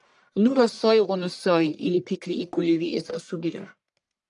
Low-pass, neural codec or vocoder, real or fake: 10.8 kHz; codec, 44.1 kHz, 1.7 kbps, Pupu-Codec; fake